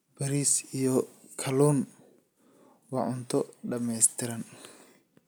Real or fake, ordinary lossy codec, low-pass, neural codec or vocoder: real; none; none; none